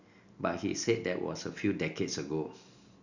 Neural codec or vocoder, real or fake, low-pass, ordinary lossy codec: none; real; 7.2 kHz; none